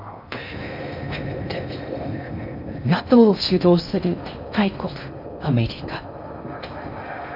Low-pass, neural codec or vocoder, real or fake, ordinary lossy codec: 5.4 kHz; codec, 16 kHz in and 24 kHz out, 0.6 kbps, FocalCodec, streaming, 2048 codes; fake; none